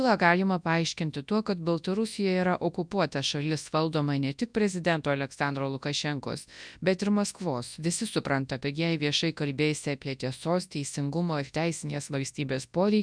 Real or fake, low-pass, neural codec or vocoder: fake; 9.9 kHz; codec, 24 kHz, 0.9 kbps, WavTokenizer, large speech release